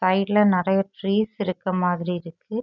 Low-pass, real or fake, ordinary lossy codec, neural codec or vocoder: 7.2 kHz; real; none; none